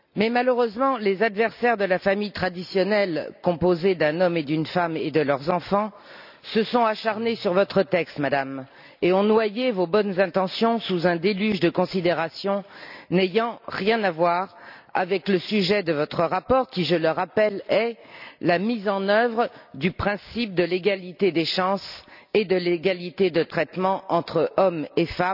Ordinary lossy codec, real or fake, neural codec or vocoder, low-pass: none; real; none; 5.4 kHz